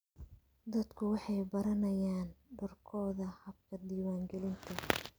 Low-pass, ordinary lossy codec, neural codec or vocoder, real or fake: none; none; none; real